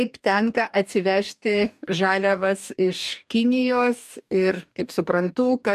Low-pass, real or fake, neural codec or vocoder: 14.4 kHz; fake; codec, 44.1 kHz, 2.6 kbps, DAC